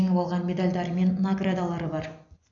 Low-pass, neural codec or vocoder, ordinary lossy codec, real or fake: 7.2 kHz; none; none; real